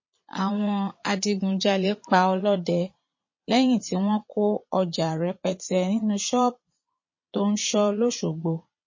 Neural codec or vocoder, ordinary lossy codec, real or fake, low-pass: vocoder, 22.05 kHz, 80 mel bands, Vocos; MP3, 32 kbps; fake; 7.2 kHz